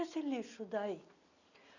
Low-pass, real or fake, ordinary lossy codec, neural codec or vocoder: 7.2 kHz; real; none; none